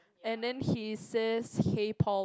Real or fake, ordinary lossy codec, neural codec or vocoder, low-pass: real; none; none; none